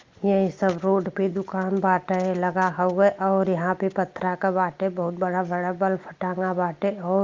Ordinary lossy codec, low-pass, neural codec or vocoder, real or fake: Opus, 32 kbps; 7.2 kHz; none; real